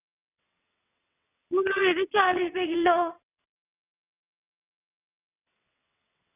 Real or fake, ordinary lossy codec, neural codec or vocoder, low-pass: fake; none; vocoder, 22.05 kHz, 80 mel bands, WaveNeXt; 3.6 kHz